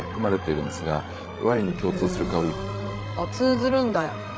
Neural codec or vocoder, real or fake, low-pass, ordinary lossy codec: codec, 16 kHz, 16 kbps, FreqCodec, larger model; fake; none; none